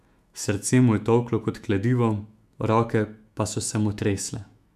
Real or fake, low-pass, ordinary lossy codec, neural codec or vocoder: fake; 14.4 kHz; none; autoencoder, 48 kHz, 128 numbers a frame, DAC-VAE, trained on Japanese speech